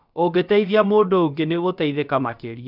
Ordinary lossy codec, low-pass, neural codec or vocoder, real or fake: none; 5.4 kHz; codec, 16 kHz, about 1 kbps, DyCAST, with the encoder's durations; fake